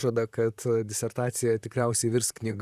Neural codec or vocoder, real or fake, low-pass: vocoder, 44.1 kHz, 128 mel bands, Pupu-Vocoder; fake; 14.4 kHz